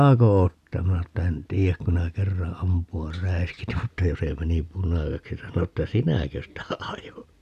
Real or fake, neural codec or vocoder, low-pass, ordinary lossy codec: real; none; 14.4 kHz; none